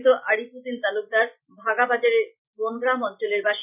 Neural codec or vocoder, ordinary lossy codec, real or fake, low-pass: none; none; real; 3.6 kHz